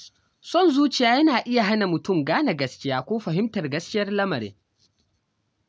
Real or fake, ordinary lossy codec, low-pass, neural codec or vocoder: real; none; none; none